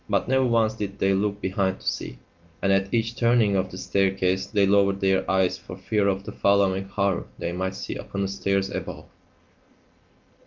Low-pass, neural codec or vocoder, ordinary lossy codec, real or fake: 7.2 kHz; none; Opus, 32 kbps; real